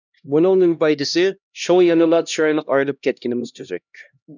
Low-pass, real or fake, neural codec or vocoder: 7.2 kHz; fake; codec, 16 kHz, 1 kbps, X-Codec, HuBERT features, trained on LibriSpeech